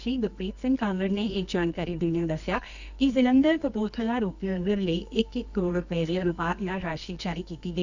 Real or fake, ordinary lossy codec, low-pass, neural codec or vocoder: fake; none; 7.2 kHz; codec, 24 kHz, 0.9 kbps, WavTokenizer, medium music audio release